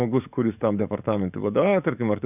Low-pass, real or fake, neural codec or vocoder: 3.6 kHz; fake; codec, 44.1 kHz, 7.8 kbps, DAC